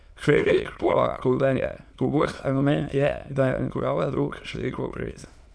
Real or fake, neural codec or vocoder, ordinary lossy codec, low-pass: fake; autoencoder, 22.05 kHz, a latent of 192 numbers a frame, VITS, trained on many speakers; none; none